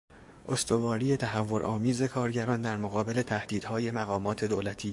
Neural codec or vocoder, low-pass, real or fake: codec, 44.1 kHz, 7.8 kbps, DAC; 10.8 kHz; fake